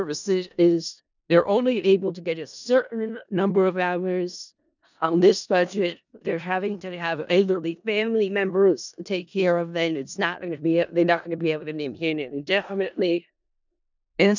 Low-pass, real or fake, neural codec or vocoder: 7.2 kHz; fake; codec, 16 kHz in and 24 kHz out, 0.4 kbps, LongCat-Audio-Codec, four codebook decoder